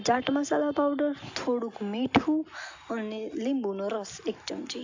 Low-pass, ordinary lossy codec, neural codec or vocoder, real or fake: 7.2 kHz; AAC, 48 kbps; none; real